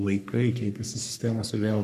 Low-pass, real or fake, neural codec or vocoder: 14.4 kHz; fake; codec, 44.1 kHz, 3.4 kbps, Pupu-Codec